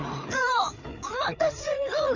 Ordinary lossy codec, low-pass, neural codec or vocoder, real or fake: none; 7.2 kHz; codec, 16 kHz, 4 kbps, FreqCodec, larger model; fake